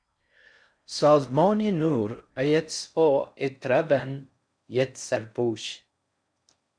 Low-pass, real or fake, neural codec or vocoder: 9.9 kHz; fake; codec, 16 kHz in and 24 kHz out, 0.6 kbps, FocalCodec, streaming, 4096 codes